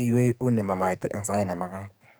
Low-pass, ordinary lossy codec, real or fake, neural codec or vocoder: none; none; fake; codec, 44.1 kHz, 2.6 kbps, SNAC